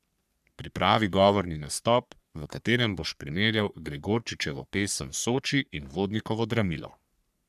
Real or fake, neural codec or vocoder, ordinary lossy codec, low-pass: fake; codec, 44.1 kHz, 3.4 kbps, Pupu-Codec; none; 14.4 kHz